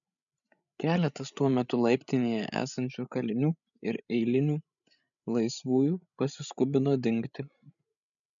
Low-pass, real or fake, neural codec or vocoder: 7.2 kHz; fake; codec, 16 kHz, 16 kbps, FreqCodec, larger model